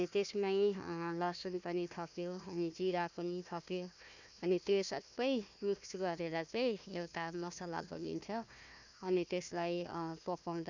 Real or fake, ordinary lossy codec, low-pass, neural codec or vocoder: fake; none; 7.2 kHz; codec, 16 kHz, 1 kbps, FunCodec, trained on Chinese and English, 50 frames a second